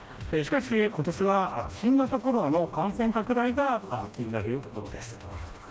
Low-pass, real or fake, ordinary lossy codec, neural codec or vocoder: none; fake; none; codec, 16 kHz, 1 kbps, FreqCodec, smaller model